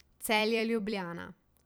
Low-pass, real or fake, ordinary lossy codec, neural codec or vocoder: none; fake; none; vocoder, 44.1 kHz, 128 mel bands every 256 samples, BigVGAN v2